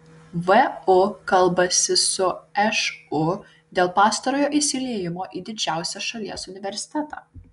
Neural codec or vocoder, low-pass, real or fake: none; 10.8 kHz; real